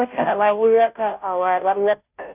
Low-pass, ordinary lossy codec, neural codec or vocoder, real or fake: 3.6 kHz; none; codec, 16 kHz, 0.5 kbps, FunCodec, trained on Chinese and English, 25 frames a second; fake